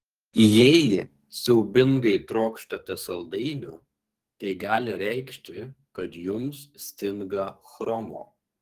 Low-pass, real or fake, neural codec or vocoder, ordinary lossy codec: 14.4 kHz; fake; codec, 44.1 kHz, 2.6 kbps, SNAC; Opus, 24 kbps